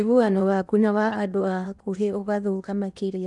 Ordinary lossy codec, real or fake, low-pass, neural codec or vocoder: none; fake; 10.8 kHz; codec, 16 kHz in and 24 kHz out, 0.8 kbps, FocalCodec, streaming, 65536 codes